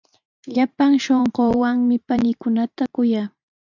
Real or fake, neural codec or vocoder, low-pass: fake; vocoder, 44.1 kHz, 128 mel bands every 512 samples, BigVGAN v2; 7.2 kHz